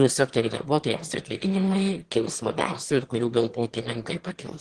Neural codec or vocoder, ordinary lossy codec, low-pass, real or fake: autoencoder, 22.05 kHz, a latent of 192 numbers a frame, VITS, trained on one speaker; Opus, 16 kbps; 9.9 kHz; fake